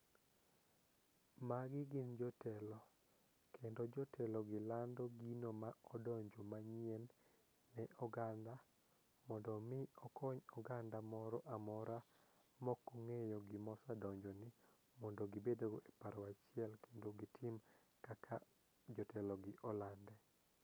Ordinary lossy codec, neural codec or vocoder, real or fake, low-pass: none; none; real; none